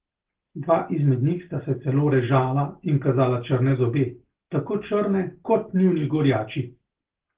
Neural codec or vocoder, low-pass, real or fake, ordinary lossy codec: none; 3.6 kHz; real; Opus, 16 kbps